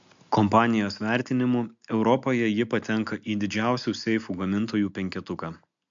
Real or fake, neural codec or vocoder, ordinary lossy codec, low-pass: real; none; MP3, 64 kbps; 7.2 kHz